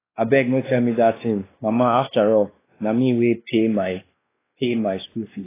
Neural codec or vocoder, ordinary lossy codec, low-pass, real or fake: codec, 16 kHz, 2 kbps, X-Codec, WavLM features, trained on Multilingual LibriSpeech; AAC, 16 kbps; 3.6 kHz; fake